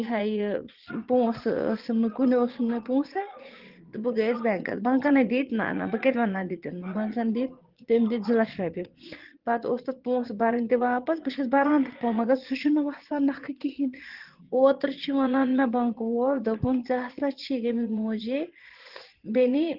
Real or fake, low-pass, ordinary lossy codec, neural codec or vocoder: fake; 5.4 kHz; Opus, 16 kbps; vocoder, 22.05 kHz, 80 mel bands, Vocos